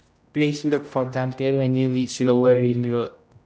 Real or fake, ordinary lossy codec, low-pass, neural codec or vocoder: fake; none; none; codec, 16 kHz, 0.5 kbps, X-Codec, HuBERT features, trained on general audio